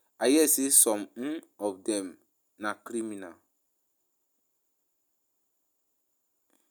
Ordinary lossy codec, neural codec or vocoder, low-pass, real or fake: none; none; none; real